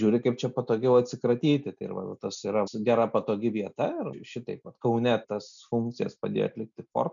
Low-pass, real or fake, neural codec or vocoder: 7.2 kHz; real; none